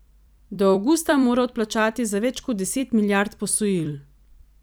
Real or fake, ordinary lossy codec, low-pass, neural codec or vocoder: fake; none; none; vocoder, 44.1 kHz, 128 mel bands every 256 samples, BigVGAN v2